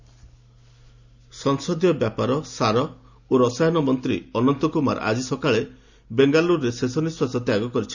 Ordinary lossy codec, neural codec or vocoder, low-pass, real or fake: none; none; 7.2 kHz; real